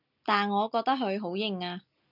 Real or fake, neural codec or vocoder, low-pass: real; none; 5.4 kHz